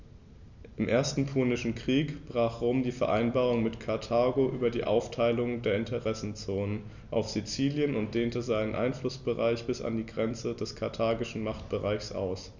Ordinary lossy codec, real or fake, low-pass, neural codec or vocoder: none; real; 7.2 kHz; none